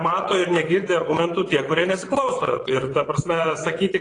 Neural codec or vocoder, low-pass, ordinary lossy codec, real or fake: vocoder, 22.05 kHz, 80 mel bands, WaveNeXt; 9.9 kHz; AAC, 32 kbps; fake